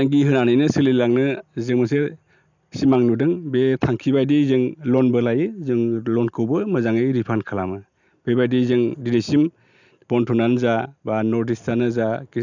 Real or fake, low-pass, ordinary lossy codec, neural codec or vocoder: real; 7.2 kHz; none; none